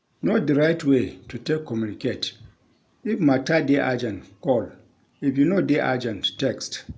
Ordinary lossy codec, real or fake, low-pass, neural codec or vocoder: none; real; none; none